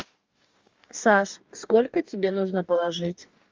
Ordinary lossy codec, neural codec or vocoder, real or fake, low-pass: Opus, 32 kbps; codec, 44.1 kHz, 2.6 kbps, DAC; fake; 7.2 kHz